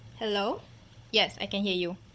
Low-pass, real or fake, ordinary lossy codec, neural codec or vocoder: none; fake; none; codec, 16 kHz, 16 kbps, FunCodec, trained on Chinese and English, 50 frames a second